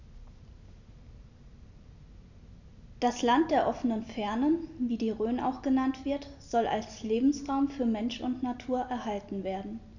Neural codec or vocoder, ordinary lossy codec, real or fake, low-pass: none; none; real; 7.2 kHz